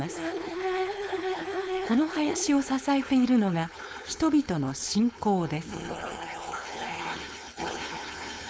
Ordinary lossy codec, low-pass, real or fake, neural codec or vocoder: none; none; fake; codec, 16 kHz, 4.8 kbps, FACodec